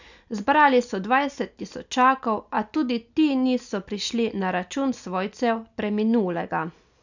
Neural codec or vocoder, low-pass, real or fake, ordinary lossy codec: none; 7.2 kHz; real; none